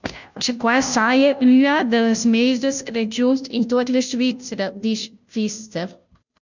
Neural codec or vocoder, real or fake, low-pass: codec, 16 kHz, 0.5 kbps, FunCodec, trained on Chinese and English, 25 frames a second; fake; 7.2 kHz